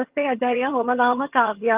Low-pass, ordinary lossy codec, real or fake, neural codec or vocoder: 3.6 kHz; Opus, 32 kbps; fake; vocoder, 22.05 kHz, 80 mel bands, HiFi-GAN